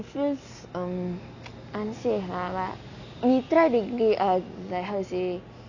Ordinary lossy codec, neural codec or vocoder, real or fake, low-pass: none; none; real; 7.2 kHz